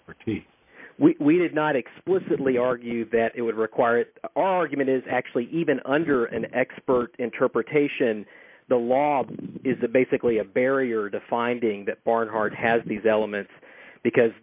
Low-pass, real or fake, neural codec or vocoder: 3.6 kHz; real; none